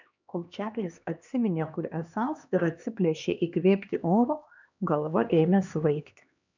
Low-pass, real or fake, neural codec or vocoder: 7.2 kHz; fake; codec, 16 kHz, 2 kbps, X-Codec, HuBERT features, trained on LibriSpeech